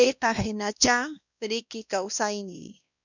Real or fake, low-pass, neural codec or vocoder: fake; 7.2 kHz; codec, 16 kHz, 1 kbps, X-Codec, WavLM features, trained on Multilingual LibriSpeech